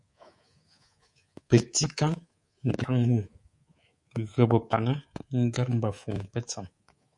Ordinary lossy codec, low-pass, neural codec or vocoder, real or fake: MP3, 48 kbps; 10.8 kHz; codec, 24 kHz, 3.1 kbps, DualCodec; fake